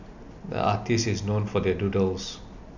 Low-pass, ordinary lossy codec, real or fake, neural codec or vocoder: 7.2 kHz; none; real; none